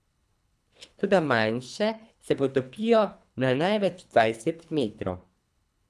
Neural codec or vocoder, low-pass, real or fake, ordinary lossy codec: codec, 24 kHz, 3 kbps, HILCodec; none; fake; none